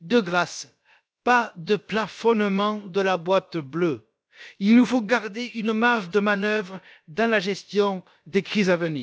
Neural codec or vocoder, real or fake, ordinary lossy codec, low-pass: codec, 16 kHz, about 1 kbps, DyCAST, with the encoder's durations; fake; none; none